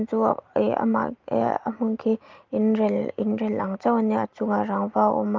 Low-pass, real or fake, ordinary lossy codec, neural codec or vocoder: 7.2 kHz; real; Opus, 32 kbps; none